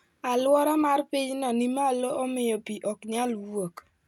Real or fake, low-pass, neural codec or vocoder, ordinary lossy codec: real; 19.8 kHz; none; none